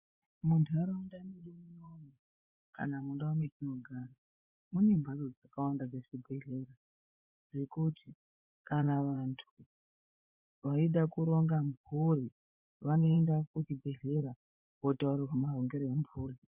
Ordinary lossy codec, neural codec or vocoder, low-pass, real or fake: Opus, 64 kbps; vocoder, 24 kHz, 100 mel bands, Vocos; 3.6 kHz; fake